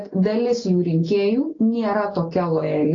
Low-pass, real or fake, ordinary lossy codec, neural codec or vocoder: 7.2 kHz; real; AAC, 32 kbps; none